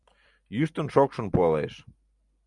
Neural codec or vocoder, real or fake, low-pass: none; real; 10.8 kHz